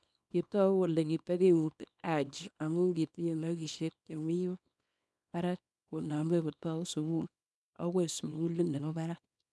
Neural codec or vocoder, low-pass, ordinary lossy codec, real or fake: codec, 24 kHz, 0.9 kbps, WavTokenizer, small release; none; none; fake